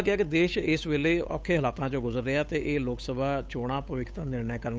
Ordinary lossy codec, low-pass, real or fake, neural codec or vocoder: none; none; fake; codec, 16 kHz, 8 kbps, FunCodec, trained on Chinese and English, 25 frames a second